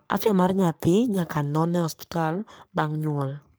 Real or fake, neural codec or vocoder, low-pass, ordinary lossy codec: fake; codec, 44.1 kHz, 3.4 kbps, Pupu-Codec; none; none